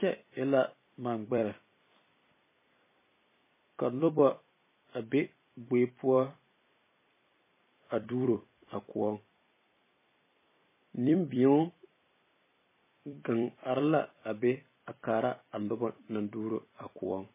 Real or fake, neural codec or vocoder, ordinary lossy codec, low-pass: fake; vocoder, 44.1 kHz, 128 mel bands every 256 samples, BigVGAN v2; MP3, 16 kbps; 3.6 kHz